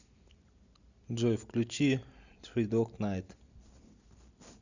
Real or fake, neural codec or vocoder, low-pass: real; none; 7.2 kHz